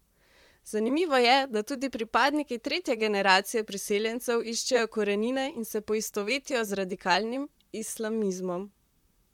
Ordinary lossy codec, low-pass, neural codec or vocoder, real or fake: MP3, 96 kbps; 19.8 kHz; vocoder, 44.1 kHz, 128 mel bands, Pupu-Vocoder; fake